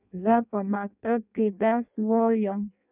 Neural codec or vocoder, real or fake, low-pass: codec, 16 kHz in and 24 kHz out, 0.6 kbps, FireRedTTS-2 codec; fake; 3.6 kHz